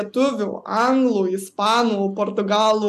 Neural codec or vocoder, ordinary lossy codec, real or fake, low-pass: none; AAC, 96 kbps; real; 14.4 kHz